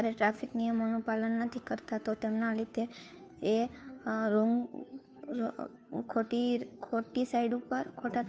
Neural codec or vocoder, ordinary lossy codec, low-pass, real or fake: codec, 16 kHz, 2 kbps, FunCodec, trained on Chinese and English, 25 frames a second; none; none; fake